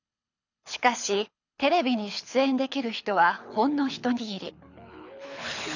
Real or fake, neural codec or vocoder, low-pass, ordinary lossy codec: fake; codec, 24 kHz, 6 kbps, HILCodec; 7.2 kHz; none